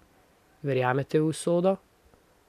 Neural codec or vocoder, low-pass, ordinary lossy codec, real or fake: none; 14.4 kHz; MP3, 96 kbps; real